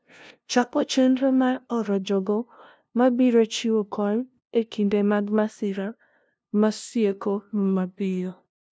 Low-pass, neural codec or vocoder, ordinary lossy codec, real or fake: none; codec, 16 kHz, 0.5 kbps, FunCodec, trained on LibriTTS, 25 frames a second; none; fake